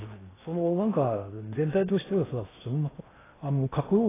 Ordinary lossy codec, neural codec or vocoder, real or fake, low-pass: AAC, 16 kbps; codec, 16 kHz in and 24 kHz out, 0.6 kbps, FocalCodec, streaming, 4096 codes; fake; 3.6 kHz